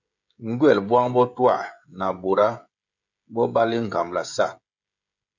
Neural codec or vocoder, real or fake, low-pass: codec, 16 kHz, 16 kbps, FreqCodec, smaller model; fake; 7.2 kHz